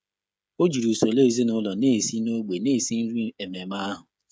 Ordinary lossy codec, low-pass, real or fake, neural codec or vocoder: none; none; fake; codec, 16 kHz, 16 kbps, FreqCodec, smaller model